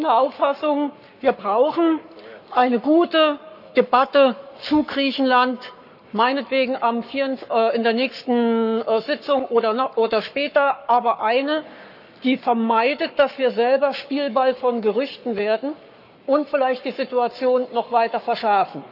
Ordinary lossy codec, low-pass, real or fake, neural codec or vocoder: none; 5.4 kHz; fake; codec, 44.1 kHz, 7.8 kbps, Pupu-Codec